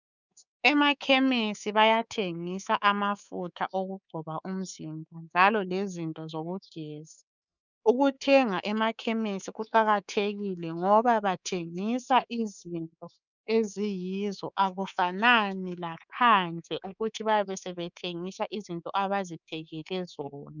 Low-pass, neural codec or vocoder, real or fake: 7.2 kHz; codec, 24 kHz, 3.1 kbps, DualCodec; fake